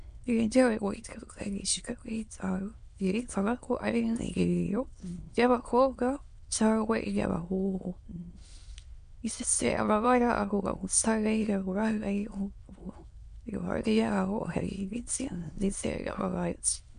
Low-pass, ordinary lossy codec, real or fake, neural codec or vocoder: 9.9 kHz; MP3, 64 kbps; fake; autoencoder, 22.05 kHz, a latent of 192 numbers a frame, VITS, trained on many speakers